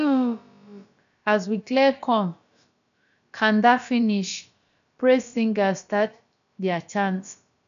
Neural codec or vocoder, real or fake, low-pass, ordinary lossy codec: codec, 16 kHz, about 1 kbps, DyCAST, with the encoder's durations; fake; 7.2 kHz; none